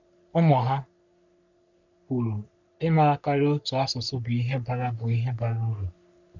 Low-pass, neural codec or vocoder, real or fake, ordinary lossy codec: 7.2 kHz; codec, 44.1 kHz, 3.4 kbps, Pupu-Codec; fake; none